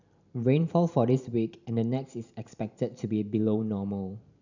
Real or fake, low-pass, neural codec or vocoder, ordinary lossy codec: fake; 7.2 kHz; vocoder, 44.1 kHz, 128 mel bands every 512 samples, BigVGAN v2; none